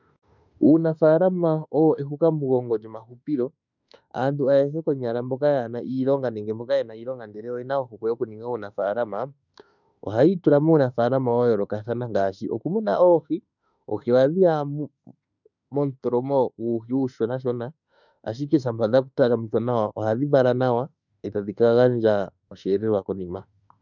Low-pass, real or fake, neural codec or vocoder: 7.2 kHz; fake; autoencoder, 48 kHz, 32 numbers a frame, DAC-VAE, trained on Japanese speech